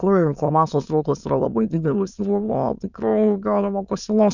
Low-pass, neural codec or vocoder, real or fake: 7.2 kHz; autoencoder, 22.05 kHz, a latent of 192 numbers a frame, VITS, trained on many speakers; fake